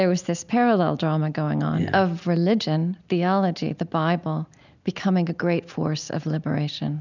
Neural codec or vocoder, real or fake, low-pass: none; real; 7.2 kHz